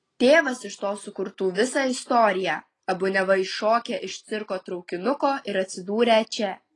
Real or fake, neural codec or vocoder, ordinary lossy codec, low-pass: real; none; AAC, 32 kbps; 10.8 kHz